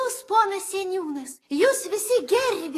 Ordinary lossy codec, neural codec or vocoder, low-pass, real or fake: AAC, 48 kbps; codec, 44.1 kHz, 7.8 kbps, DAC; 14.4 kHz; fake